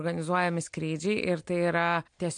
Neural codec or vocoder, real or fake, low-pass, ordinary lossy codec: none; real; 9.9 kHz; MP3, 64 kbps